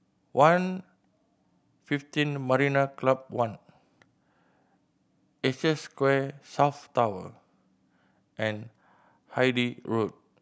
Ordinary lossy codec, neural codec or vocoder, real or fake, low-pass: none; none; real; none